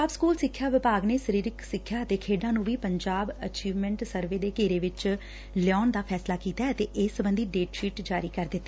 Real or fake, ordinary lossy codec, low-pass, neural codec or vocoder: real; none; none; none